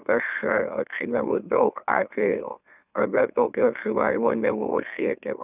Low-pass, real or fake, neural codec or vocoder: 3.6 kHz; fake; autoencoder, 44.1 kHz, a latent of 192 numbers a frame, MeloTTS